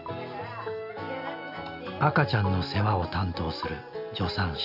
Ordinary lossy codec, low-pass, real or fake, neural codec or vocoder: none; 5.4 kHz; real; none